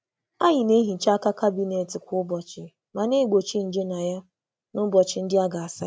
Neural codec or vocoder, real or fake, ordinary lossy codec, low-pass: none; real; none; none